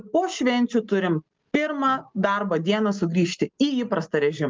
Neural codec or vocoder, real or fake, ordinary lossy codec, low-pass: none; real; Opus, 32 kbps; 7.2 kHz